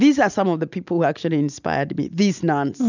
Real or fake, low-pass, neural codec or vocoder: real; 7.2 kHz; none